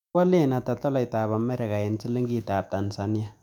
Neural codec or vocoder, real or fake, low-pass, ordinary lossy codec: autoencoder, 48 kHz, 128 numbers a frame, DAC-VAE, trained on Japanese speech; fake; 19.8 kHz; none